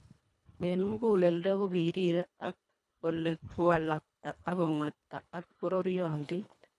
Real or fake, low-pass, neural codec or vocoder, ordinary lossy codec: fake; none; codec, 24 kHz, 1.5 kbps, HILCodec; none